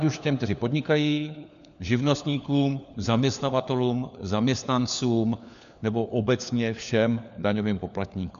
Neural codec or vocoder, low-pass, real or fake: codec, 16 kHz, 4 kbps, FunCodec, trained on LibriTTS, 50 frames a second; 7.2 kHz; fake